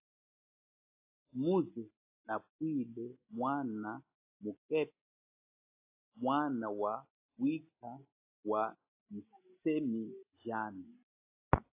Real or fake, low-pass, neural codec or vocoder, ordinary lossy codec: real; 3.6 kHz; none; AAC, 32 kbps